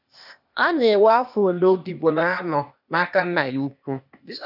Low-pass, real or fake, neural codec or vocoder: 5.4 kHz; fake; codec, 16 kHz, 0.8 kbps, ZipCodec